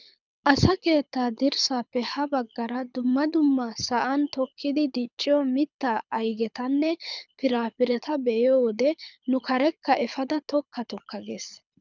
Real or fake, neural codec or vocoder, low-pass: fake; codec, 24 kHz, 6 kbps, HILCodec; 7.2 kHz